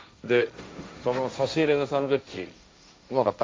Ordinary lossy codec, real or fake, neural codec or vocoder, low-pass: none; fake; codec, 16 kHz, 1.1 kbps, Voila-Tokenizer; none